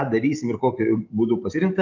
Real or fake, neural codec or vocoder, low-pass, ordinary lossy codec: fake; vocoder, 44.1 kHz, 128 mel bands every 512 samples, BigVGAN v2; 7.2 kHz; Opus, 32 kbps